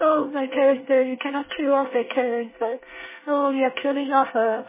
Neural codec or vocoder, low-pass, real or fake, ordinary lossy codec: codec, 24 kHz, 1 kbps, SNAC; 3.6 kHz; fake; MP3, 16 kbps